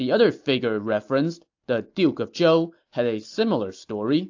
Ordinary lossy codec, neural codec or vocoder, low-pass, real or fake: AAC, 48 kbps; none; 7.2 kHz; real